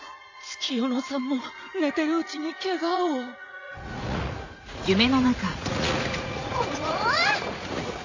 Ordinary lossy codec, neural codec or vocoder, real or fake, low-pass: AAC, 48 kbps; vocoder, 44.1 kHz, 80 mel bands, Vocos; fake; 7.2 kHz